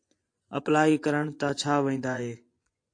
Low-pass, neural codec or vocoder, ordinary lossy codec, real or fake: 9.9 kHz; vocoder, 24 kHz, 100 mel bands, Vocos; AAC, 48 kbps; fake